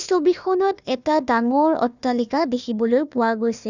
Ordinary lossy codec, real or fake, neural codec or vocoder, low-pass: none; fake; codec, 16 kHz, 1 kbps, FunCodec, trained on Chinese and English, 50 frames a second; 7.2 kHz